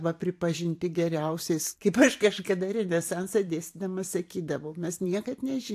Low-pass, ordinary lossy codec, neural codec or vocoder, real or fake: 14.4 kHz; AAC, 64 kbps; vocoder, 44.1 kHz, 128 mel bands every 512 samples, BigVGAN v2; fake